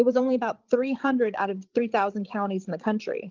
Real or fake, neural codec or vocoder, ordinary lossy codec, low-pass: fake; codec, 16 kHz, 8 kbps, FreqCodec, larger model; Opus, 24 kbps; 7.2 kHz